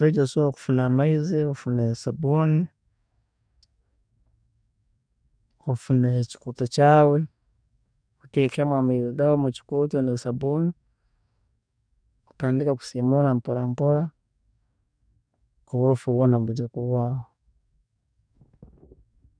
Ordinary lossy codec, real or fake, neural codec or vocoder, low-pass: none; fake; codec, 44.1 kHz, 2.6 kbps, DAC; 9.9 kHz